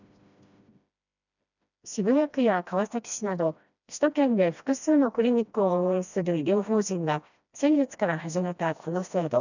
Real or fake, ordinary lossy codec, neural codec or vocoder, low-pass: fake; none; codec, 16 kHz, 1 kbps, FreqCodec, smaller model; 7.2 kHz